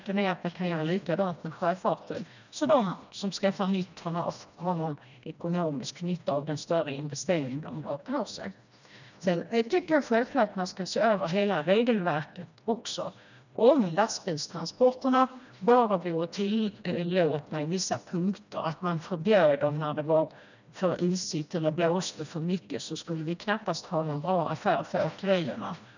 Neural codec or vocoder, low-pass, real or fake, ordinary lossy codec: codec, 16 kHz, 1 kbps, FreqCodec, smaller model; 7.2 kHz; fake; none